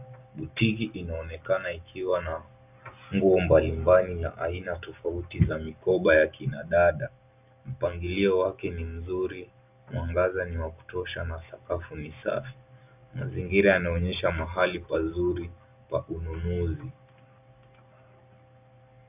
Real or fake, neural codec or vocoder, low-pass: real; none; 3.6 kHz